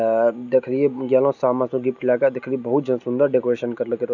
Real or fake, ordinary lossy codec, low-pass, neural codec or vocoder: real; none; 7.2 kHz; none